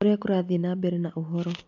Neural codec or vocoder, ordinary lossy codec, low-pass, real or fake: none; none; 7.2 kHz; real